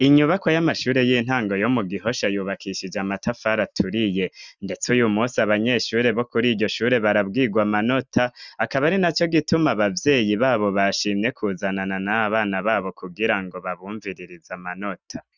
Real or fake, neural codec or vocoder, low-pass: real; none; 7.2 kHz